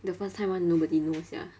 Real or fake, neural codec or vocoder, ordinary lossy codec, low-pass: real; none; none; none